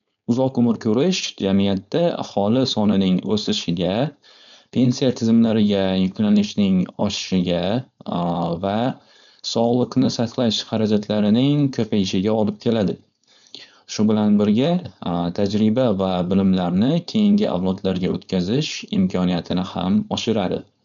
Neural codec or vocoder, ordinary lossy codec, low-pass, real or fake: codec, 16 kHz, 4.8 kbps, FACodec; none; 7.2 kHz; fake